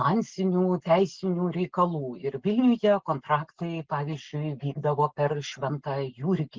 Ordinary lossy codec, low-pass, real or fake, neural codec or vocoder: Opus, 16 kbps; 7.2 kHz; real; none